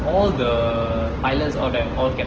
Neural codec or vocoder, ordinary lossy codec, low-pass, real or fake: none; Opus, 16 kbps; 7.2 kHz; real